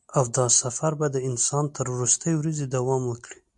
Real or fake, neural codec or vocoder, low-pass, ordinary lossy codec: real; none; 10.8 kHz; MP3, 64 kbps